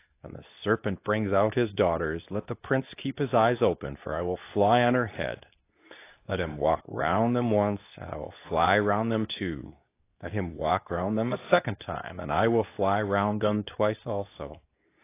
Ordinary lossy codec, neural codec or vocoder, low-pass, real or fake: AAC, 24 kbps; codec, 24 kHz, 0.9 kbps, WavTokenizer, medium speech release version 2; 3.6 kHz; fake